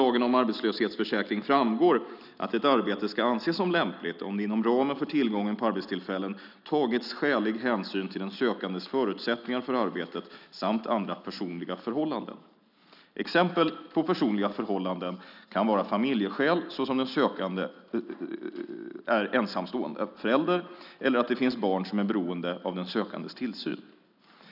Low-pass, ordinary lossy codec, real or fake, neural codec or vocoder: 5.4 kHz; none; real; none